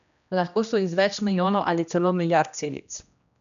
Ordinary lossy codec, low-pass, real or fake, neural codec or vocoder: none; 7.2 kHz; fake; codec, 16 kHz, 1 kbps, X-Codec, HuBERT features, trained on general audio